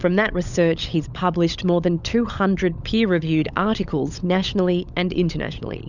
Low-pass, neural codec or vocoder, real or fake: 7.2 kHz; codec, 16 kHz, 16 kbps, FunCodec, trained on LibriTTS, 50 frames a second; fake